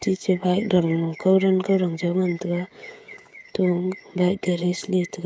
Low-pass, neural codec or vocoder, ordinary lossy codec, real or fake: none; codec, 16 kHz, 16 kbps, FreqCodec, smaller model; none; fake